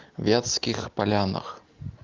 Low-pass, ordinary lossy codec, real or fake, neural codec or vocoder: 7.2 kHz; Opus, 24 kbps; real; none